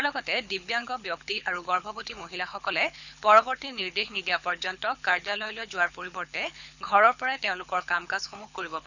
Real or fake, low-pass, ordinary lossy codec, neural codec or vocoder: fake; 7.2 kHz; none; codec, 24 kHz, 6 kbps, HILCodec